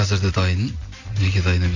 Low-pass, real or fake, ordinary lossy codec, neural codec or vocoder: 7.2 kHz; real; AAC, 48 kbps; none